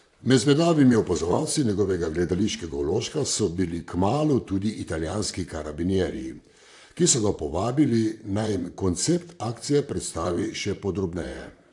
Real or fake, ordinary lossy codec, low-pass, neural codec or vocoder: fake; AAC, 64 kbps; 10.8 kHz; vocoder, 44.1 kHz, 128 mel bands, Pupu-Vocoder